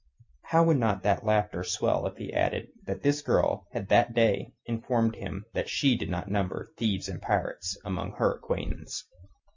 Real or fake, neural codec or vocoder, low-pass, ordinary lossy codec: real; none; 7.2 kHz; MP3, 64 kbps